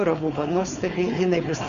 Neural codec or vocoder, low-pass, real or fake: codec, 16 kHz, 4.8 kbps, FACodec; 7.2 kHz; fake